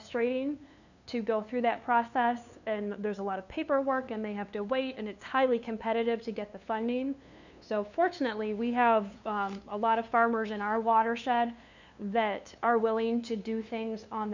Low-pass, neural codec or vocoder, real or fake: 7.2 kHz; codec, 16 kHz, 2 kbps, FunCodec, trained on LibriTTS, 25 frames a second; fake